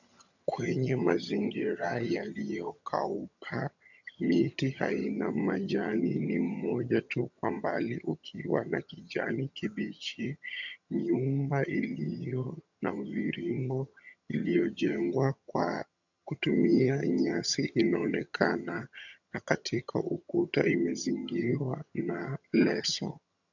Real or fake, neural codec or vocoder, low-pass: fake; vocoder, 22.05 kHz, 80 mel bands, HiFi-GAN; 7.2 kHz